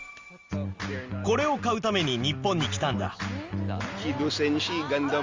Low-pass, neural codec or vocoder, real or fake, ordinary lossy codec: 7.2 kHz; none; real; Opus, 32 kbps